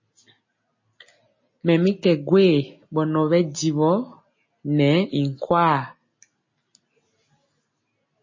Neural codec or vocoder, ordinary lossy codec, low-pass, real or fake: none; MP3, 32 kbps; 7.2 kHz; real